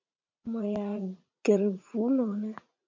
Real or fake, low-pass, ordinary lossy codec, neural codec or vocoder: fake; 7.2 kHz; MP3, 64 kbps; vocoder, 44.1 kHz, 80 mel bands, Vocos